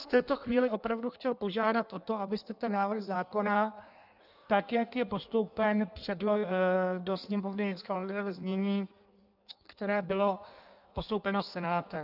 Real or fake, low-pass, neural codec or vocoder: fake; 5.4 kHz; codec, 16 kHz in and 24 kHz out, 1.1 kbps, FireRedTTS-2 codec